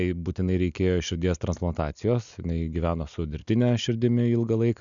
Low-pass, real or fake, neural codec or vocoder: 7.2 kHz; real; none